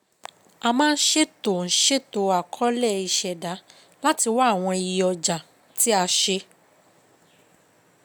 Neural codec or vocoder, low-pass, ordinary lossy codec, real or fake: none; none; none; real